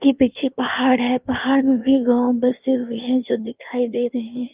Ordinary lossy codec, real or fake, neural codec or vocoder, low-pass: Opus, 24 kbps; fake; codec, 16 kHz in and 24 kHz out, 1.1 kbps, FireRedTTS-2 codec; 3.6 kHz